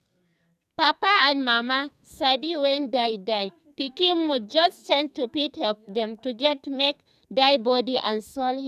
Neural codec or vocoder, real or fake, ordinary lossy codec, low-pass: codec, 44.1 kHz, 2.6 kbps, SNAC; fake; none; 14.4 kHz